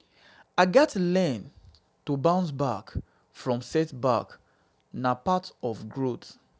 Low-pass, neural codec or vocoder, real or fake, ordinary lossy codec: none; none; real; none